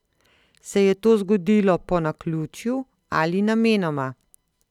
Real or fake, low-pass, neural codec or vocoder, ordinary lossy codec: real; 19.8 kHz; none; none